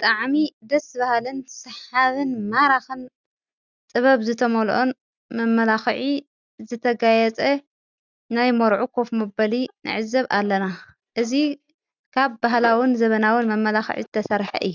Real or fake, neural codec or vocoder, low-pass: real; none; 7.2 kHz